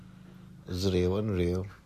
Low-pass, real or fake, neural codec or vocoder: 14.4 kHz; real; none